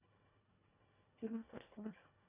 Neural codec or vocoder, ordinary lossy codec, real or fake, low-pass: codec, 24 kHz, 1.5 kbps, HILCodec; none; fake; 3.6 kHz